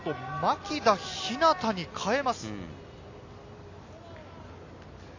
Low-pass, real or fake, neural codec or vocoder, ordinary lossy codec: 7.2 kHz; real; none; AAC, 48 kbps